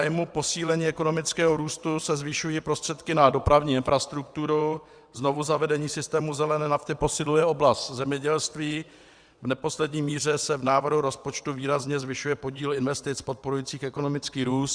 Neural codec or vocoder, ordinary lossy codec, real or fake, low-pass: vocoder, 22.05 kHz, 80 mel bands, WaveNeXt; Opus, 64 kbps; fake; 9.9 kHz